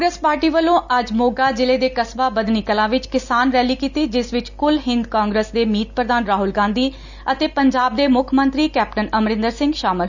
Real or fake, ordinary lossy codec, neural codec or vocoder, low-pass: real; none; none; 7.2 kHz